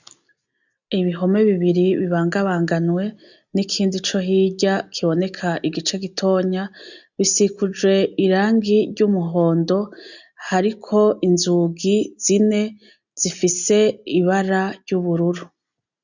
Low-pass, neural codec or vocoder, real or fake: 7.2 kHz; none; real